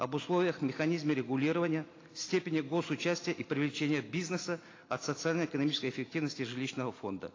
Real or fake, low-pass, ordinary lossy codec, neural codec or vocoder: real; 7.2 kHz; AAC, 32 kbps; none